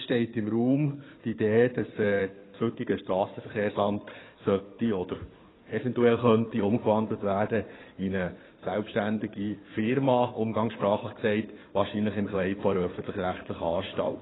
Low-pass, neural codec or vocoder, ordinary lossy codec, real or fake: 7.2 kHz; codec, 16 kHz in and 24 kHz out, 2.2 kbps, FireRedTTS-2 codec; AAC, 16 kbps; fake